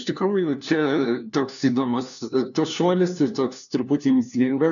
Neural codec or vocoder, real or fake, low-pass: codec, 16 kHz, 1 kbps, FunCodec, trained on LibriTTS, 50 frames a second; fake; 7.2 kHz